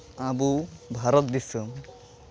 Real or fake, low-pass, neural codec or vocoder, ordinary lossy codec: real; none; none; none